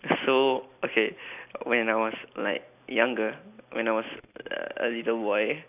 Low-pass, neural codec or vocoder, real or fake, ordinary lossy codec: 3.6 kHz; none; real; none